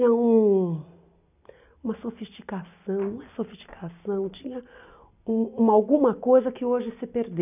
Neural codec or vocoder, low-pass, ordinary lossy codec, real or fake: none; 3.6 kHz; none; real